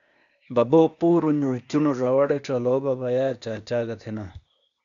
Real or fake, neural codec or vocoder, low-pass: fake; codec, 16 kHz, 0.8 kbps, ZipCodec; 7.2 kHz